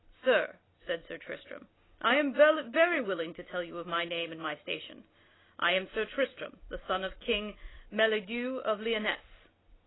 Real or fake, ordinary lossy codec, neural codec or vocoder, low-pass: real; AAC, 16 kbps; none; 7.2 kHz